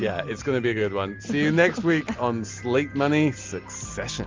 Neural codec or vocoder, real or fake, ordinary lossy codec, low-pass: none; real; Opus, 32 kbps; 7.2 kHz